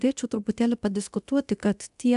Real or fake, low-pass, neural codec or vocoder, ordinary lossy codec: fake; 10.8 kHz; codec, 24 kHz, 0.9 kbps, DualCodec; AAC, 96 kbps